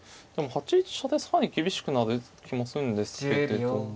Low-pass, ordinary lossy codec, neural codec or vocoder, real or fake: none; none; none; real